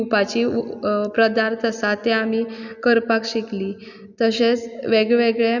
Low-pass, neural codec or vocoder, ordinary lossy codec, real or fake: 7.2 kHz; none; none; real